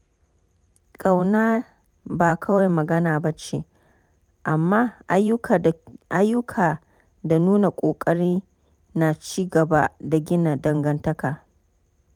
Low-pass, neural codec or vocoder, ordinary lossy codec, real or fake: 19.8 kHz; vocoder, 44.1 kHz, 128 mel bands every 512 samples, BigVGAN v2; none; fake